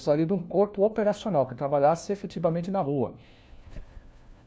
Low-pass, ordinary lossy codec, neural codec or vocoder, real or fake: none; none; codec, 16 kHz, 1 kbps, FunCodec, trained on LibriTTS, 50 frames a second; fake